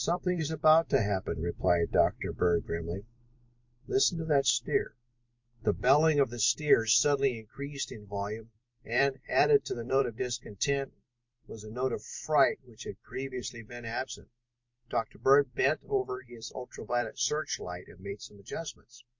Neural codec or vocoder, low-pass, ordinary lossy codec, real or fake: vocoder, 44.1 kHz, 128 mel bands every 256 samples, BigVGAN v2; 7.2 kHz; MP3, 48 kbps; fake